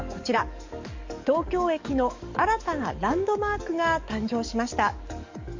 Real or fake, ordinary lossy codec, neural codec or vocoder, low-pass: fake; MP3, 48 kbps; codec, 44.1 kHz, 7.8 kbps, DAC; 7.2 kHz